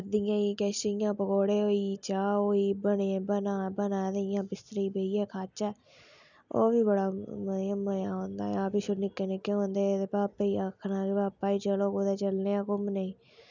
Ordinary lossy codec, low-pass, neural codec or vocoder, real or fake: none; 7.2 kHz; none; real